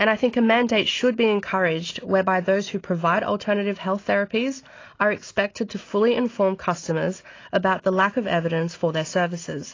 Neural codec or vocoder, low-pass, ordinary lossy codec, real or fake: none; 7.2 kHz; AAC, 32 kbps; real